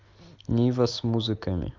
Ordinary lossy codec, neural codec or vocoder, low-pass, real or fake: Opus, 32 kbps; none; 7.2 kHz; real